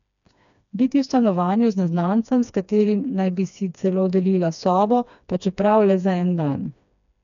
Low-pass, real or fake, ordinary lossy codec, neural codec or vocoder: 7.2 kHz; fake; none; codec, 16 kHz, 2 kbps, FreqCodec, smaller model